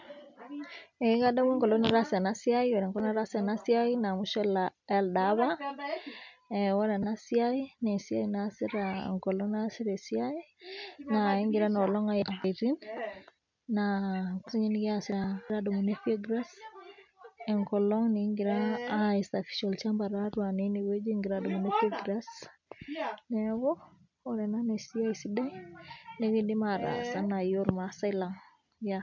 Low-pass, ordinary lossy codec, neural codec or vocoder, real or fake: 7.2 kHz; MP3, 64 kbps; none; real